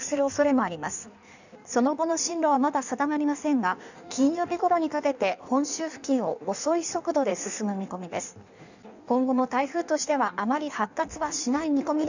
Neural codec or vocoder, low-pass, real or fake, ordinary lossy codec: codec, 16 kHz in and 24 kHz out, 1.1 kbps, FireRedTTS-2 codec; 7.2 kHz; fake; none